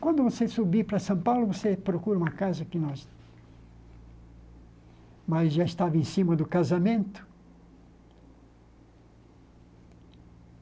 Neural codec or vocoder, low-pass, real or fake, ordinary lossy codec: none; none; real; none